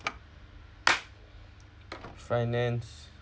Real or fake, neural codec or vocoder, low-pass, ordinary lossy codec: real; none; none; none